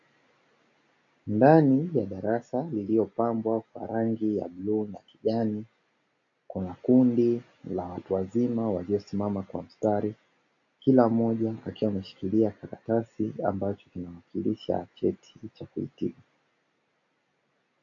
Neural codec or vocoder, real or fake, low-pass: none; real; 7.2 kHz